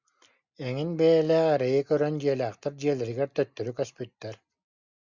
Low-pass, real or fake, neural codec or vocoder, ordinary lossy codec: 7.2 kHz; real; none; Opus, 64 kbps